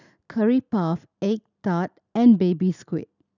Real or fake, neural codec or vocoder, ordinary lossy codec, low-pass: real; none; none; 7.2 kHz